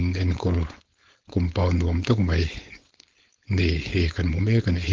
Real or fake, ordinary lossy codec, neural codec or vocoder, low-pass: fake; Opus, 16 kbps; codec, 16 kHz, 4.8 kbps, FACodec; 7.2 kHz